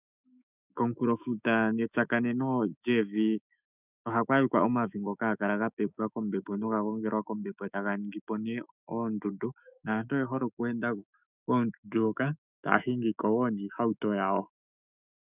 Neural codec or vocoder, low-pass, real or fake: autoencoder, 48 kHz, 128 numbers a frame, DAC-VAE, trained on Japanese speech; 3.6 kHz; fake